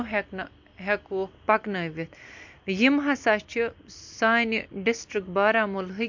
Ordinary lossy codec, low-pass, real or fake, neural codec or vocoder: MP3, 48 kbps; 7.2 kHz; real; none